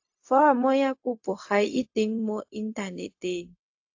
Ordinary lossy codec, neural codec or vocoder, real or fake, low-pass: AAC, 48 kbps; codec, 16 kHz, 0.4 kbps, LongCat-Audio-Codec; fake; 7.2 kHz